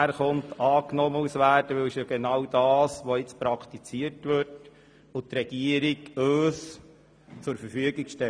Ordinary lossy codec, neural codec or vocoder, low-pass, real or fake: none; none; 9.9 kHz; real